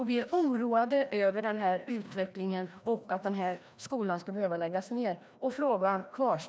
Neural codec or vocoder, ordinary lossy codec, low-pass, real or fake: codec, 16 kHz, 1 kbps, FreqCodec, larger model; none; none; fake